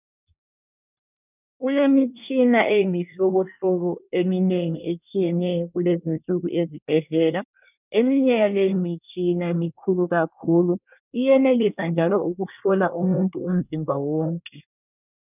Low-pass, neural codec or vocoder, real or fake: 3.6 kHz; codec, 24 kHz, 1 kbps, SNAC; fake